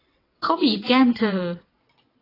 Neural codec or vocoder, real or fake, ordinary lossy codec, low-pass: vocoder, 22.05 kHz, 80 mel bands, WaveNeXt; fake; AAC, 24 kbps; 5.4 kHz